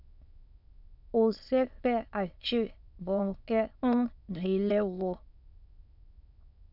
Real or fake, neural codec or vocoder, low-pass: fake; autoencoder, 22.05 kHz, a latent of 192 numbers a frame, VITS, trained on many speakers; 5.4 kHz